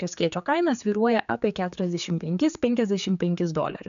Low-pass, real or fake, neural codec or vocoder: 7.2 kHz; fake; codec, 16 kHz, 4 kbps, X-Codec, HuBERT features, trained on general audio